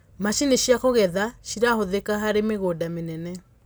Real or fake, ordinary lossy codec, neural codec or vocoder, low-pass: real; none; none; none